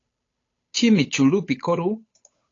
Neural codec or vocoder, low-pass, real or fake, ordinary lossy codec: codec, 16 kHz, 8 kbps, FunCodec, trained on Chinese and English, 25 frames a second; 7.2 kHz; fake; AAC, 32 kbps